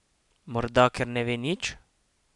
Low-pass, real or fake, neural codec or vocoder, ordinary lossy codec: 10.8 kHz; real; none; none